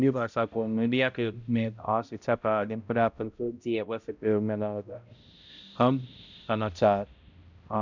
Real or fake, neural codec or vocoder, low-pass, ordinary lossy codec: fake; codec, 16 kHz, 0.5 kbps, X-Codec, HuBERT features, trained on balanced general audio; 7.2 kHz; none